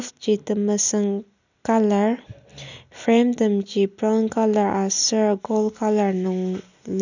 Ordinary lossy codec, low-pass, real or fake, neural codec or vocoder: none; 7.2 kHz; real; none